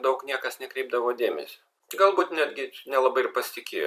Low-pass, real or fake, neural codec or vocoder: 14.4 kHz; fake; vocoder, 44.1 kHz, 128 mel bands every 256 samples, BigVGAN v2